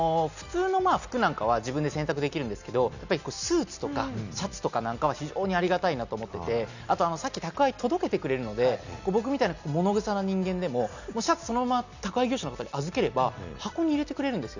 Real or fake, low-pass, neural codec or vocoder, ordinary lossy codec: real; 7.2 kHz; none; none